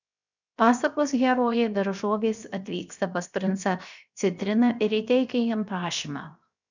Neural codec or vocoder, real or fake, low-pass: codec, 16 kHz, 0.7 kbps, FocalCodec; fake; 7.2 kHz